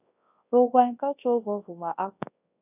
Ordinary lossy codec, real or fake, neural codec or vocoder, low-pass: AAC, 32 kbps; fake; codec, 24 kHz, 0.9 kbps, WavTokenizer, large speech release; 3.6 kHz